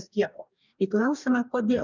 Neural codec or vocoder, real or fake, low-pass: codec, 44.1 kHz, 2.6 kbps, DAC; fake; 7.2 kHz